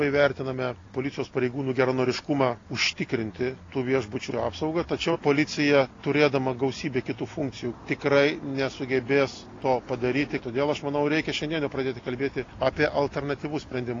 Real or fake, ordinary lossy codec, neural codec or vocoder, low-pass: real; AAC, 32 kbps; none; 7.2 kHz